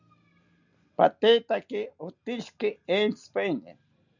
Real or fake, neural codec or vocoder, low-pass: real; none; 7.2 kHz